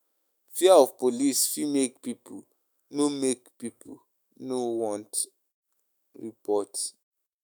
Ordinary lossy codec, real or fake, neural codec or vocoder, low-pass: none; fake; autoencoder, 48 kHz, 128 numbers a frame, DAC-VAE, trained on Japanese speech; none